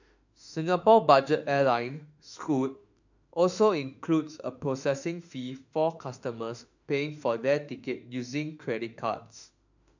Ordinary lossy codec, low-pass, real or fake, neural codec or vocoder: none; 7.2 kHz; fake; autoencoder, 48 kHz, 32 numbers a frame, DAC-VAE, trained on Japanese speech